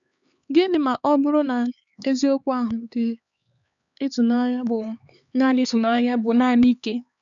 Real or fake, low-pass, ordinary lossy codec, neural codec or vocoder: fake; 7.2 kHz; none; codec, 16 kHz, 4 kbps, X-Codec, HuBERT features, trained on LibriSpeech